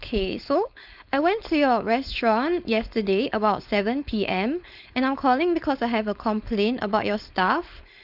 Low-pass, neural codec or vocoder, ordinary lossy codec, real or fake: 5.4 kHz; codec, 16 kHz, 4.8 kbps, FACodec; none; fake